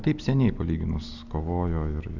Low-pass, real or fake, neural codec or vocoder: 7.2 kHz; real; none